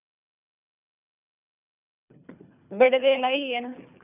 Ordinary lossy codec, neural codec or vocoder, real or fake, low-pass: none; codec, 24 kHz, 3 kbps, HILCodec; fake; 3.6 kHz